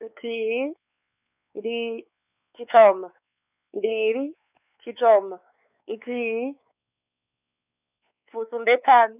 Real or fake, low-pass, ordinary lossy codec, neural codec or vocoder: fake; 3.6 kHz; none; codec, 16 kHz, 4 kbps, X-Codec, WavLM features, trained on Multilingual LibriSpeech